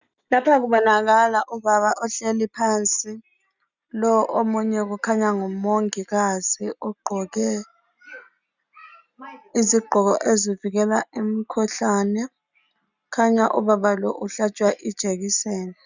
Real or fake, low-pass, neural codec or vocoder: real; 7.2 kHz; none